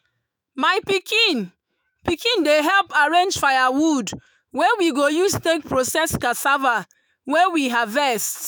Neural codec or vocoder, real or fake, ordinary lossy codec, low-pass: autoencoder, 48 kHz, 128 numbers a frame, DAC-VAE, trained on Japanese speech; fake; none; none